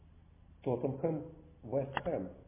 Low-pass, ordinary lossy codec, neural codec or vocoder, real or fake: 3.6 kHz; MP3, 24 kbps; none; real